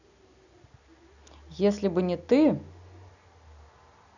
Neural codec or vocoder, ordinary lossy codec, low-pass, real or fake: none; none; 7.2 kHz; real